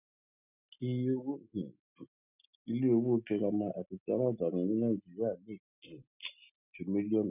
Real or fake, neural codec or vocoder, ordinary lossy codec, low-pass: real; none; none; 3.6 kHz